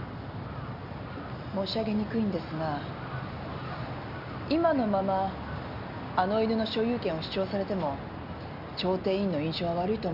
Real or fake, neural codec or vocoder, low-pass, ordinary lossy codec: real; none; 5.4 kHz; none